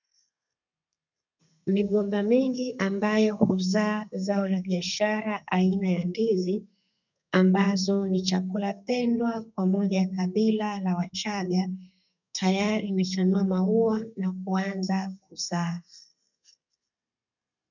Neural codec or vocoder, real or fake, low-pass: codec, 32 kHz, 1.9 kbps, SNAC; fake; 7.2 kHz